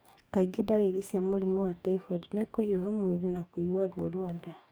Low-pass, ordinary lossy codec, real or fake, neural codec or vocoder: none; none; fake; codec, 44.1 kHz, 2.6 kbps, DAC